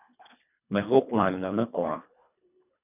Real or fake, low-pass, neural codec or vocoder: fake; 3.6 kHz; codec, 24 kHz, 1.5 kbps, HILCodec